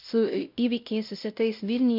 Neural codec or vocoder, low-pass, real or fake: codec, 16 kHz, 0.5 kbps, X-Codec, WavLM features, trained on Multilingual LibriSpeech; 5.4 kHz; fake